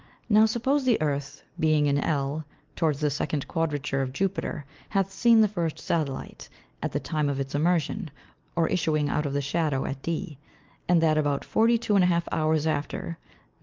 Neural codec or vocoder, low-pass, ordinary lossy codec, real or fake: none; 7.2 kHz; Opus, 32 kbps; real